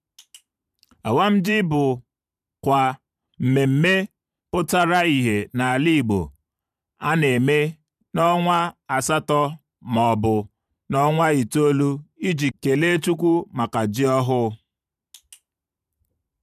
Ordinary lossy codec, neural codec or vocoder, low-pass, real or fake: none; vocoder, 44.1 kHz, 128 mel bands every 512 samples, BigVGAN v2; 14.4 kHz; fake